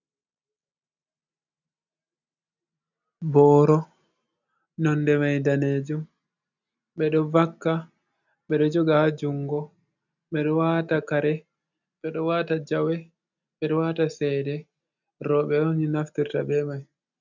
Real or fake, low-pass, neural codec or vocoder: real; 7.2 kHz; none